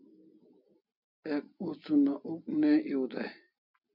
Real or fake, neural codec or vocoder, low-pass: real; none; 5.4 kHz